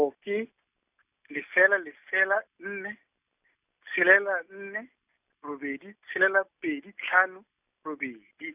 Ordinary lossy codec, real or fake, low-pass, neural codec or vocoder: none; real; 3.6 kHz; none